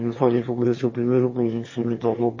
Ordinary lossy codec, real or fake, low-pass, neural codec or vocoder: MP3, 32 kbps; fake; 7.2 kHz; autoencoder, 22.05 kHz, a latent of 192 numbers a frame, VITS, trained on one speaker